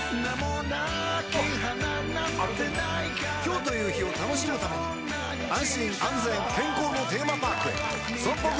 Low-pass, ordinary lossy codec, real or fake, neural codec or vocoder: none; none; real; none